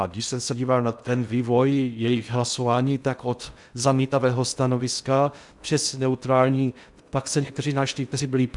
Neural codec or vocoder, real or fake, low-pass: codec, 16 kHz in and 24 kHz out, 0.6 kbps, FocalCodec, streaming, 2048 codes; fake; 10.8 kHz